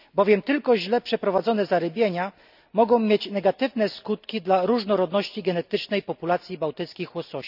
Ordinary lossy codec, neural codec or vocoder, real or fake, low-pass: none; none; real; 5.4 kHz